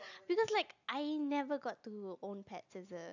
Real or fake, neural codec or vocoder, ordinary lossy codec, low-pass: real; none; none; 7.2 kHz